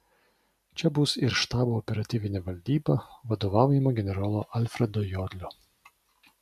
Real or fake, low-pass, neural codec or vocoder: real; 14.4 kHz; none